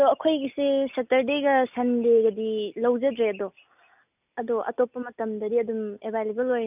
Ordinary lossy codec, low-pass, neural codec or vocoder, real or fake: none; 3.6 kHz; none; real